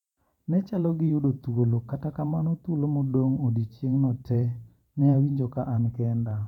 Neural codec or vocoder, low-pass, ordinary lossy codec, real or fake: vocoder, 44.1 kHz, 128 mel bands every 512 samples, BigVGAN v2; 19.8 kHz; none; fake